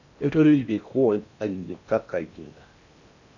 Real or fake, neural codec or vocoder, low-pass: fake; codec, 16 kHz in and 24 kHz out, 0.6 kbps, FocalCodec, streaming, 4096 codes; 7.2 kHz